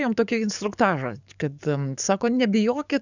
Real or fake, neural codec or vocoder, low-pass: fake; codec, 44.1 kHz, 7.8 kbps, DAC; 7.2 kHz